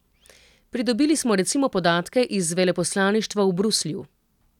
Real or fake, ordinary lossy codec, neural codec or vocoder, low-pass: real; none; none; 19.8 kHz